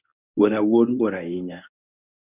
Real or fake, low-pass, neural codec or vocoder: fake; 3.6 kHz; codec, 24 kHz, 0.9 kbps, WavTokenizer, medium speech release version 1